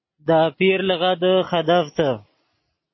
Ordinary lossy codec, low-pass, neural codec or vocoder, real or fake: MP3, 24 kbps; 7.2 kHz; none; real